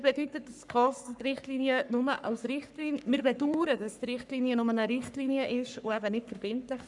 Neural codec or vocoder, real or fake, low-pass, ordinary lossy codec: codec, 44.1 kHz, 3.4 kbps, Pupu-Codec; fake; 10.8 kHz; none